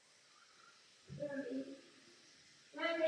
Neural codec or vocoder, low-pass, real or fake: none; 9.9 kHz; real